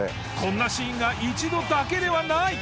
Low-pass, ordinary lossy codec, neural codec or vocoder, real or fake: none; none; none; real